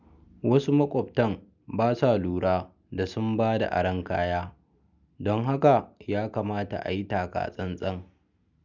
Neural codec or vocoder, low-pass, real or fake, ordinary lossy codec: none; 7.2 kHz; real; none